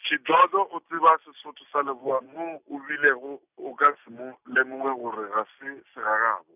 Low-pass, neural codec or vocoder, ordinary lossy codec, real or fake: 3.6 kHz; none; none; real